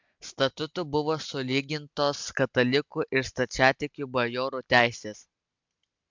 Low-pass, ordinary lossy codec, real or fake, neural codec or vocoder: 7.2 kHz; MP3, 64 kbps; real; none